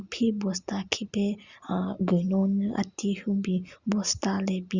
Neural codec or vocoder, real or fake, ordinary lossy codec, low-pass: none; real; Opus, 64 kbps; 7.2 kHz